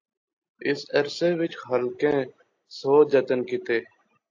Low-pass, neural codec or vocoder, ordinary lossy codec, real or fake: 7.2 kHz; none; AAC, 48 kbps; real